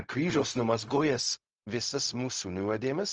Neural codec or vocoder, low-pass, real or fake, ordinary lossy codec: codec, 16 kHz, 0.4 kbps, LongCat-Audio-Codec; 7.2 kHz; fake; Opus, 24 kbps